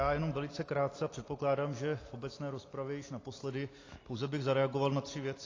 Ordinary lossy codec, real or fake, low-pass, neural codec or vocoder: AAC, 32 kbps; real; 7.2 kHz; none